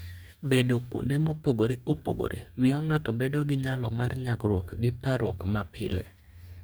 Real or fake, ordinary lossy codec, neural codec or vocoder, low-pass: fake; none; codec, 44.1 kHz, 2.6 kbps, DAC; none